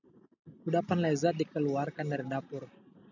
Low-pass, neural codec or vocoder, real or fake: 7.2 kHz; none; real